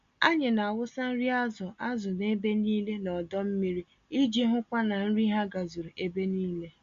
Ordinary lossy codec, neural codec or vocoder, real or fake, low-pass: Opus, 64 kbps; none; real; 7.2 kHz